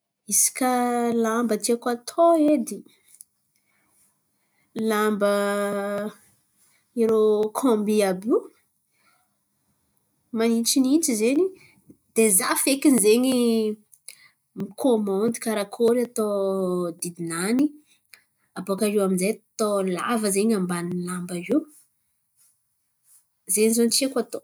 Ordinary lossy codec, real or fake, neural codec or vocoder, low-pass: none; real; none; none